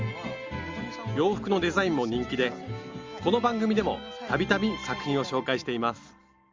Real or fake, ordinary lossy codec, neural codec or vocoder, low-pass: real; Opus, 32 kbps; none; 7.2 kHz